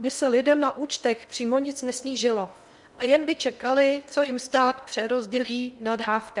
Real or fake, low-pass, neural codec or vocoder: fake; 10.8 kHz; codec, 16 kHz in and 24 kHz out, 0.8 kbps, FocalCodec, streaming, 65536 codes